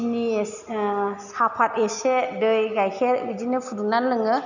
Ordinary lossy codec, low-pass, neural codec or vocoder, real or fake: none; 7.2 kHz; none; real